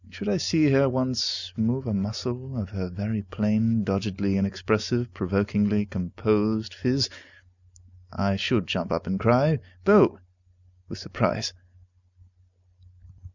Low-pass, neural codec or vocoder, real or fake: 7.2 kHz; none; real